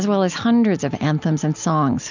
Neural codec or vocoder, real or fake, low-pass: none; real; 7.2 kHz